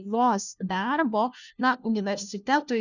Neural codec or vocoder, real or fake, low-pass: codec, 16 kHz, 1 kbps, FunCodec, trained on LibriTTS, 50 frames a second; fake; 7.2 kHz